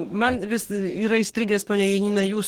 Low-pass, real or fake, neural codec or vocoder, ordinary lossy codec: 19.8 kHz; fake; codec, 44.1 kHz, 2.6 kbps, DAC; Opus, 16 kbps